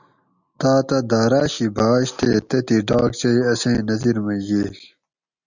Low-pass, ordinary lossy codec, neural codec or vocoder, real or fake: 7.2 kHz; Opus, 64 kbps; none; real